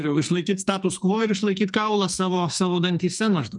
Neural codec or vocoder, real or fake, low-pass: codec, 44.1 kHz, 2.6 kbps, SNAC; fake; 10.8 kHz